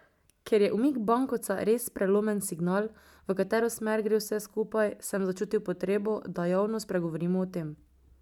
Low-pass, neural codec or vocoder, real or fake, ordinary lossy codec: 19.8 kHz; none; real; none